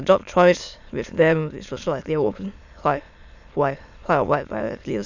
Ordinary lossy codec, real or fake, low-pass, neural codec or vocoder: none; fake; 7.2 kHz; autoencoder, 22.05 kHz, a latent of 192 numbers a frame, VITS, trained on many speakers